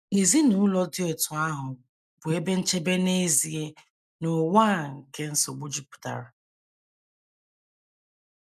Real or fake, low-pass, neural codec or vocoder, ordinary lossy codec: real; 14.4 kHz; none; none